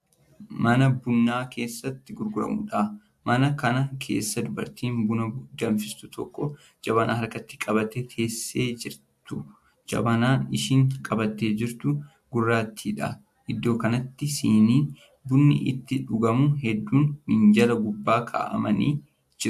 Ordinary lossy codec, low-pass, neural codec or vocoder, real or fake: AAC, 96 kbps; 14.4 kHz; none; real